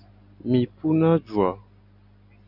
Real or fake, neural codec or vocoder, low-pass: real; none; 5.4 kHz